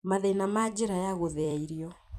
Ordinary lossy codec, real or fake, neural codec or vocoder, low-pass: none; fake; vocoder, 44.1 kHz, 128 mel bands every 256 samples, BigVGAN v2; 14.4 kHz